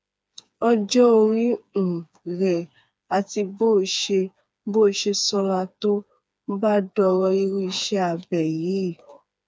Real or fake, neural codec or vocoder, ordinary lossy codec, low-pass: fake; codec, 16 kHz, 4 kbps, FreqCodec, smaller model; none; none